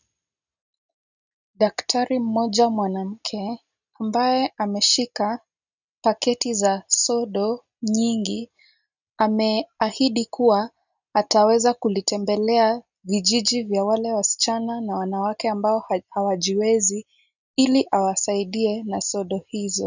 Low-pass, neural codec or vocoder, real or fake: 7.2 kHz; none; real